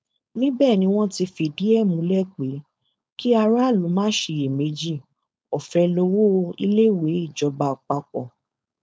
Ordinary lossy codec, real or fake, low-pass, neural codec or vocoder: none; fake; none; codec, 16 kHz, 4.8 kbps, FACodec